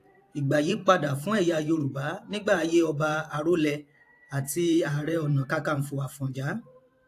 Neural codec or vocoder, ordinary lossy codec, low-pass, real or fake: vocoder, 44.1 kHz, 128 mel bands every 512 samples, BigVGAN v2; AAC, 64 kbps; 14.4 kHz; fake